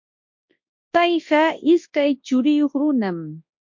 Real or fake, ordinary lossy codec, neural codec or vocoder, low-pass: fake; MP3, 48 kbps; codec, 24 kHz, 0.9 kbps, WavTokenizer, large speech release; 7.2 kHz